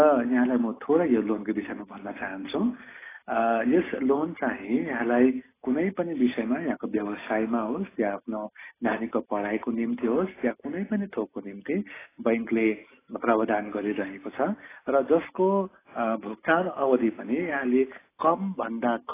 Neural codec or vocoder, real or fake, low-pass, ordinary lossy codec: none; real; 3.6 kHz; AAC, 16 kbps